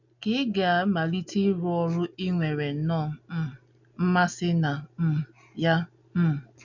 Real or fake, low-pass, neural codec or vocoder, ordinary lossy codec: real; 7.2 kHz; none; none